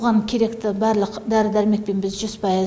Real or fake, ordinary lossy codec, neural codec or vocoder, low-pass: real; none; none; none